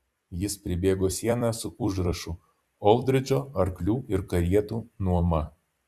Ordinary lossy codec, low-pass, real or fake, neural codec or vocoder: Opus, 64 kbps; 14.4 kHz; fake; vocoder, 44.1 kHz, 128 mel bands every 256 samples, BigVGAN v2